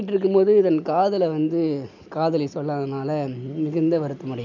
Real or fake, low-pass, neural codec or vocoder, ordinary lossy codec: real; 7.2 kHz; none; none